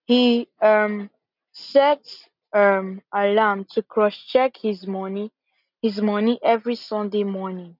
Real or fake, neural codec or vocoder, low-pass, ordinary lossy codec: real; none; 5.4 kHz; none